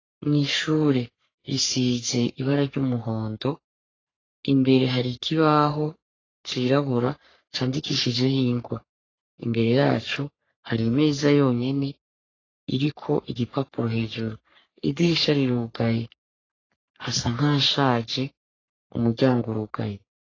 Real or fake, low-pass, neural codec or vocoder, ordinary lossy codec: fake; 7.2 kHz; codec, 44.1 kHz, 3.4 kbps, Pupu-Codec; AAC, 32 kbps